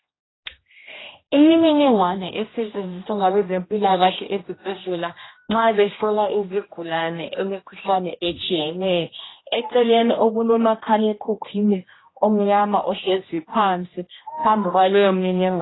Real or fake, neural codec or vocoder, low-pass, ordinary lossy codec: fake; codec, 16 kHz, 1 kbps, X-Codec, HuBERT features, trained on general audio; 7.2 kHz; AAC, 16 kbps